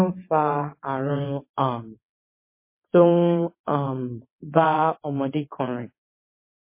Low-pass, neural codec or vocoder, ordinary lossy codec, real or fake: 3.6 kHz; vocoder, 22.05 kHz, 80 mel bands, WaveNeXt; MP3, 24 kbps; fake